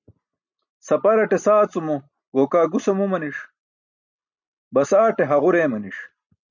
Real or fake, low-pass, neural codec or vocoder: real; 7.2 kHz; none